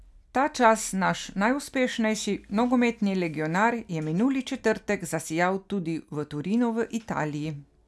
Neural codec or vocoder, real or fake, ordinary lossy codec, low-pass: none; real; none; none